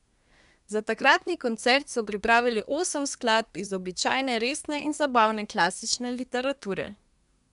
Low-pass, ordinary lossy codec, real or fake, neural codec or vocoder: 10.8 kHz; none; fake; codec, 24 kHz, 1 kbps, SNAC